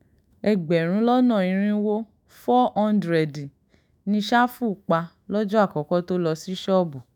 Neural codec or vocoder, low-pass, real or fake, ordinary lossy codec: autoencoder, 48 kHz, 128 numbers a frame, DAC-VAE, trained on Japanese speech; 19.8 kHz; fake; none